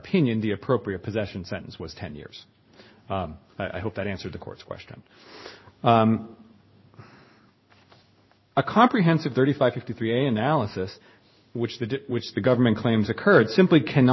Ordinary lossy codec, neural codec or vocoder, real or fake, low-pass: MP3, 24 kbps; codec, 16 kHz in and 24 kHz out, 1 kbps, XY-Tokenizer; fake; 7.2 kHz